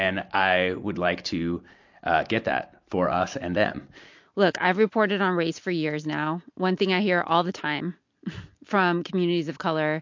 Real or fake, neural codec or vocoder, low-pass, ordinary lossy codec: real; none; 7.2 kHz; MP3, 48 kbps